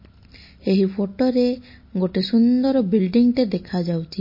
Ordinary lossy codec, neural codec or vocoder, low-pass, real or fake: MP3, 24 kbps; none; 5.4 kHz; real